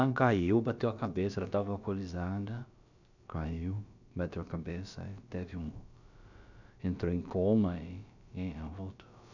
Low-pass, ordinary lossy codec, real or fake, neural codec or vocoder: 7.2 kHz; none; fake; codec, 16 kHz, about 1 kbps, DyCAST, with the encoder's durations